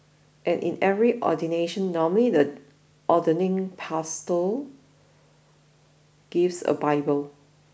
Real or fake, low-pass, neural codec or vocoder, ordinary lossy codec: real; none; none; none